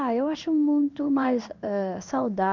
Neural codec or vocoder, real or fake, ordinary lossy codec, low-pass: codec, 16 kHz in and 24 kHz out, 1 kbps, XY-Tokenizer; fake; none; 7.2 kHz